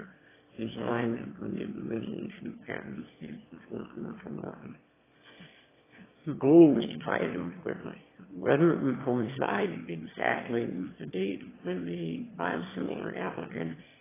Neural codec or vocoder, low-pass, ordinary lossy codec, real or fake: autoencoder, 22.05 kHz, a latent of 192 numbers a frame, VITS, trained on one speaker; 3.6 kHz; AAC, 16 kbps; fake